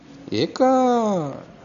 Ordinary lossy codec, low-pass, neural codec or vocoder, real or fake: none; 7.2 kHz; none; real